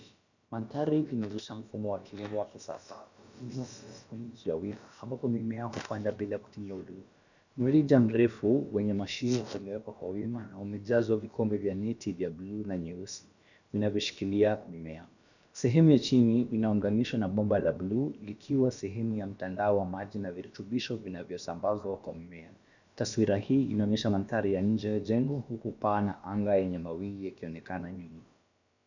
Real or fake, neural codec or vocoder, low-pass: fake; codec, 16 kHz, about 1 kbps, DyCAST, with the encoder's durations; 7.2 kHz